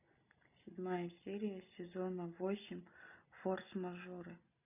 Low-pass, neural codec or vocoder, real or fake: 3.6 kHz; none; real